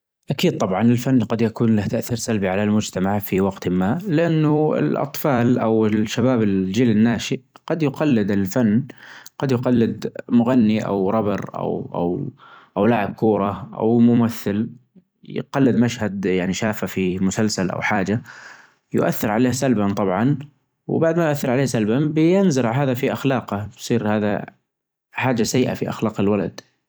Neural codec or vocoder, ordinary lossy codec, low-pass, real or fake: vocoder, 44.1 kHz, 128 mel bands every 256 samples, BigVGAN v2; none; none; fake